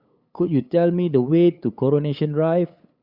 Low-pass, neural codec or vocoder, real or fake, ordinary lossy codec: 5.4 kHz; codec, 16 kHz, 8 kbps, FunCodec, trained on LibriTTS, 25 frames a second; fake; Opus, 64 kbps